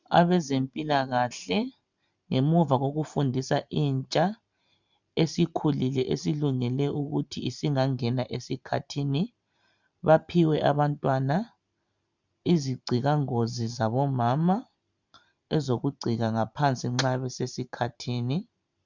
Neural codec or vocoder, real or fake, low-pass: vocoder, 44.1 kHz, 128 mel bands every 512 samples, BigVGAN v2; fake; 7.2 kHz